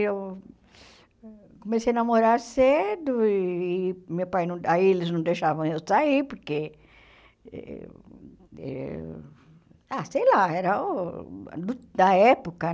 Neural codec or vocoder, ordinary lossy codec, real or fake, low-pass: none; none; real; none